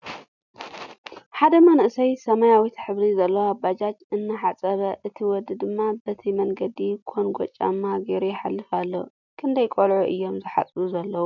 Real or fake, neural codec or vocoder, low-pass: real; none; 7.2 kHz